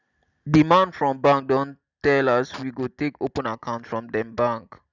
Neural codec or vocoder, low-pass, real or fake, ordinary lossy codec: none; 7.2 kHz; real; none